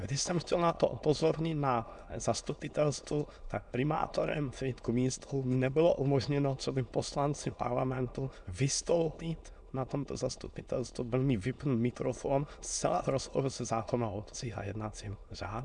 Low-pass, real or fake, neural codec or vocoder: 9.9 kHz; fake; autoencoder, 22.05 kHz, a latent of 192 numbers a frame, VITS, trained on many speakers